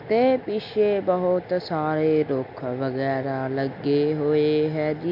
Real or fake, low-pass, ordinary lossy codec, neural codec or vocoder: real; 5.4 kHz; AAC, 48 kbps; none